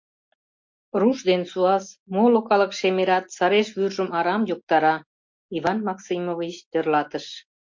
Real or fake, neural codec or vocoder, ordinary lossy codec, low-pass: real; none; MP3, 48 kbps; 7.2 kHz